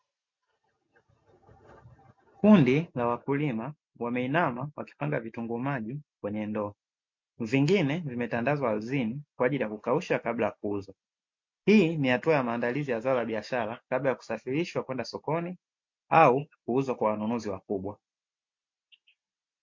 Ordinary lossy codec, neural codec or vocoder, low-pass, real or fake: MP3, 48 kbps; none; 7.2 kHz; real